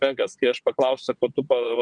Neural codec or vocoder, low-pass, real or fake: vocoder, 22.05 kHz, 80 mel bands, WaveNeXt; 9.9 kHz; fake